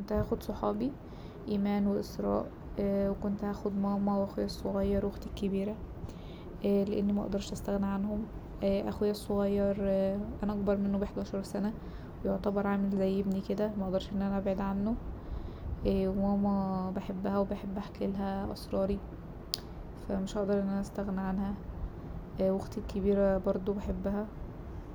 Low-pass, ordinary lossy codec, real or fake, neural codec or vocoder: none; none; real; none